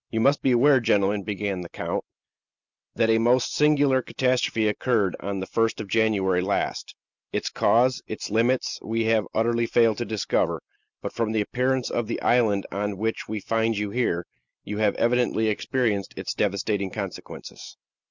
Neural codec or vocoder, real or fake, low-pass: none; real; 7.2 kHz